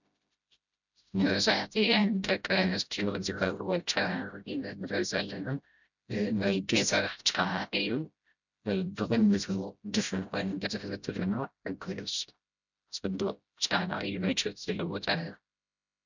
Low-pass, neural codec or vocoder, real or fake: 7.2 kHz; codec, 16 kHz, 0.5 kbps, FreqCodec, smaller model; fake